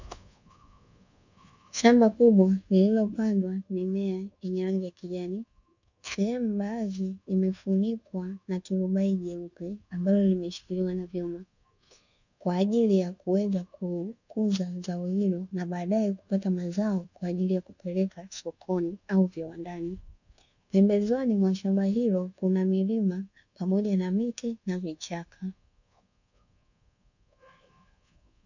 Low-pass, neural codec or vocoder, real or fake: 7.2 kHz; codec, 24 kHz, 1.2 kbps, DualCodec; fake